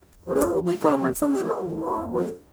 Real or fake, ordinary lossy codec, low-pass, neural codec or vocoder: fake; none; none; codec, 44.1 kHz, 0.9 kbps, DAC